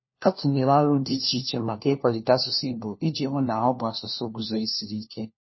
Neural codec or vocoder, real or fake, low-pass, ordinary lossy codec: codec, 16 kHz, 1 kbps, FunCodec, trained on LibriTTS, 50 frames a second; fake; 7.2 kHz; MP3, 24 kbps